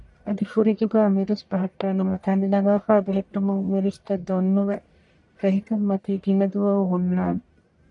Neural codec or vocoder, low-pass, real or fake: codec, 44.1 kHz, 1.7 kbps, Pupu-Codec; 10.8 kHz; fake